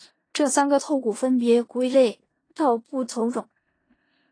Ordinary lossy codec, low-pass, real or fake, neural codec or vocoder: AAC, 32 kbps; 9.9 kHz; fake; codec, 16 kHz in and 24 kHz out, 0.4 kbps, LongCat-Audio-Codec, four codebook decoder